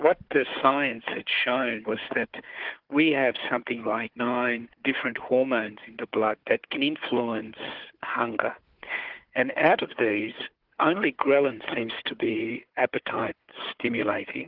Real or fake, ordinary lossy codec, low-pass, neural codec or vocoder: fake; Opus, 16 kbps; 5.4 kHz; codec, 16 kHz, 4 kbps, FunCodec, trained on Chinese and English, 50 frames a second